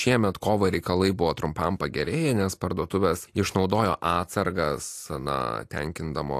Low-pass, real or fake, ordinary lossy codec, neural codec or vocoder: 14.4 kHz; real; AAC, 64 kbps; none